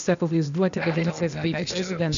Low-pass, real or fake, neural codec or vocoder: 7.2 kHz; fake; codec, 16 kHz, 0.8 kbps, ZipCodec